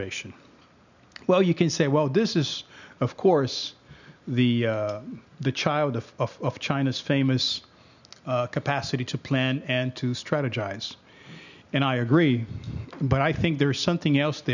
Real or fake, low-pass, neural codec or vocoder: real; 7.2 kHz; none